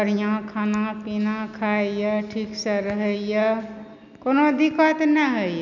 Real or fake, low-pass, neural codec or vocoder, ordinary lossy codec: real; 7.2 kHz; none; none